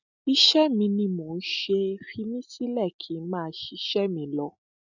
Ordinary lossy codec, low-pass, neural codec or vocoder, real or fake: none; 7.2 kHz; none; real